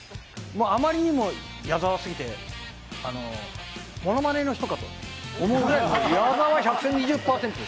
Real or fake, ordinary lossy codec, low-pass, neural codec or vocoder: real; none; none; none